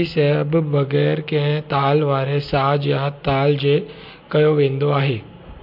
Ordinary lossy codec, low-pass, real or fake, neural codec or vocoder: MP3, 48 kbps; 5.4 kHz; real; none